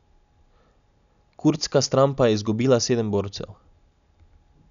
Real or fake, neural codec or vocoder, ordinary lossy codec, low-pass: real; none; none; 7.2 kHz